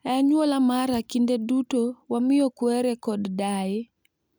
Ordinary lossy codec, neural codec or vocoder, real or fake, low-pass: none; none; real; none